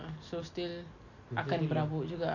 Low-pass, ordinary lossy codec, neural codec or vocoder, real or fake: 7.2 kHz; none; none; real